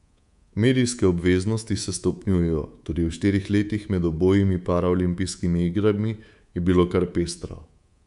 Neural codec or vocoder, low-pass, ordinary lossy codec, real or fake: codec, 24 kHz, 3.1 kbps, DualCodec; 10.8 kHz; none; fake